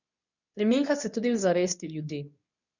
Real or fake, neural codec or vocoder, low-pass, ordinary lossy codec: fake; codec, 24 kHz, 0.9 kbps, WavTokenizer, medium speech release version 2; 7.2 kHz; none